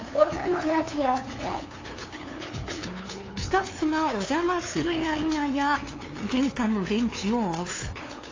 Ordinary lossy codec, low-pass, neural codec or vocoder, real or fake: AAC, 32 kbps; 7.2 kHz; codec, 16 kHz, 2 kbps, FunCodec, trained on LibriTTS, 25 frames a second; fake